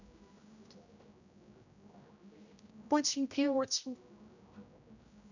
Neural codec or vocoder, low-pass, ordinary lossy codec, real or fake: codec, 16 kHz, 0.5 kbps, X-Codec, HuBERT features, trained on general audio; 7.2 kHz; none; fake